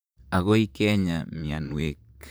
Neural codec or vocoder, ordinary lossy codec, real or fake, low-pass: vocoder, 44.1 kHz, 128 mel bands, Pupu-Vocoder; none; fake; none